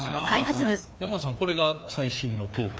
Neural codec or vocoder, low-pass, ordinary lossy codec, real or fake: codec, 16 kHz, 2 kbps, FreqCodec, larger model; none; none; fake